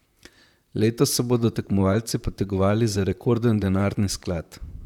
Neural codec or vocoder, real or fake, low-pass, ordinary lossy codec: vocoder, 44.1 kHz, 128 mel bands, Pupu-Vocoder; fake; 19.8 kHz; none